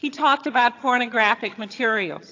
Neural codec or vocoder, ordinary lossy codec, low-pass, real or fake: vocoder, 22.05 kHz, 80 mel bands, HiFi-GAN; AAC, 48 kbps; 7.2 kHz; fake